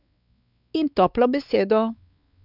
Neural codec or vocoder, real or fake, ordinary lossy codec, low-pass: codec, 16 kHz, 4 kbps, X-Codec, WavLM features, trained on Multilingual LibriSpeech; fake; none; 5.4 kHz